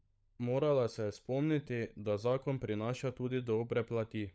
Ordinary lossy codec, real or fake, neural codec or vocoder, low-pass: none; fake; codec, 16 kHz, 16 kbps, FunCodec, trained on LibriTTS, 50 frames a second; none